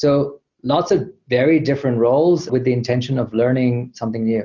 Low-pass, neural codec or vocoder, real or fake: 7.2 kHz; none; real